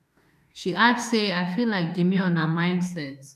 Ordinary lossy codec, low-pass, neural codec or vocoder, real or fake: none; 14.4 kHz; autoencoder, 48 kHz, 32 numbers a frame, DAC-VAE, trained on Japanese speech; fake